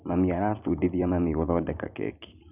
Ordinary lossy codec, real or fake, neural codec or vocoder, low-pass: none; fake; vocoder, 44.1 kHz, 128 mel bands every 256 samples, BigVGAN v2; 3.6 kHz